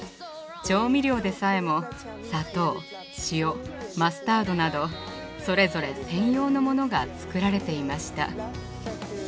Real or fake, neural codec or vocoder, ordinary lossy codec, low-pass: real; none; none; none